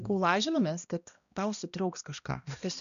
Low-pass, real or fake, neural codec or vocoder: 7.2 kHz; fake; codec, 16 kHz, 1 kbps, X-Codec, HuBERT features, trained on balanced general audio